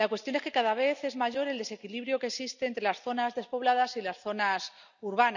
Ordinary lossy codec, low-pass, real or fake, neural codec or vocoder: none; 7.2 kHz; real; none